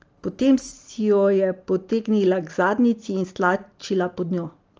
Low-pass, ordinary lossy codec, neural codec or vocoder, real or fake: 7.2 kHz; Opus, 24 kbps; none; real